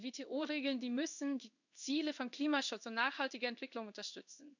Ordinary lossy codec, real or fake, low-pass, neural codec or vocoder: none; fake; 7.2 kHz; codec, 16 kHz in and 24 kHz out, 1 kbps, XY-Tokenizer